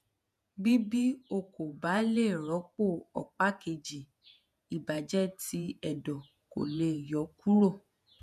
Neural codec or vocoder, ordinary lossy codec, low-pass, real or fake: vocoder, 48 kHz, 128 mel bands, Vocos; none; 14.4 kHz; fake